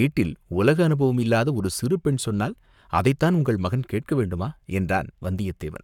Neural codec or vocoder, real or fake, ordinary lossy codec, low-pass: codec, 44.1 kHz, 7.8 kbps, Pupu-Codec; fake; none; 19.8 kHz